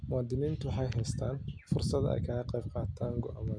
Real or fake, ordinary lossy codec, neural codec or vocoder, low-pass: real; none; none; 9.9 kHz